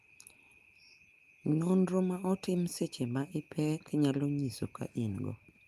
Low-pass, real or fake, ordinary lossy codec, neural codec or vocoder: 14.4 kHz; real; Opus, 32 kbps; none